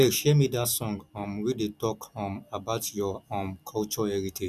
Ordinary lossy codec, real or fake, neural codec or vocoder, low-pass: none; real; none; 14.4 kHz